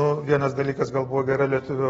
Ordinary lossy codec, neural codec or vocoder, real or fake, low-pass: AAC, 24 kbps; none; real; 19.8 kHz